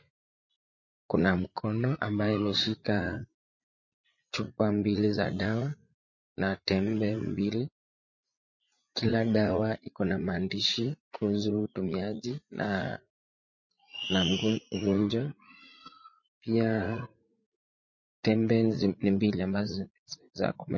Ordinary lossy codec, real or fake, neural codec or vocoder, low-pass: MP3, 32 kbps; fake; vocoder, 44.1 kHz, 80 mel bands, Vocos; 7.2 kHz